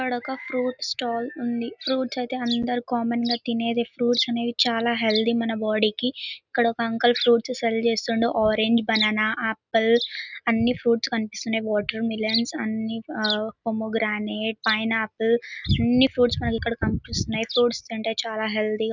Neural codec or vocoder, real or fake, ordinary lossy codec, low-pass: none; real; none; 7.2 kHz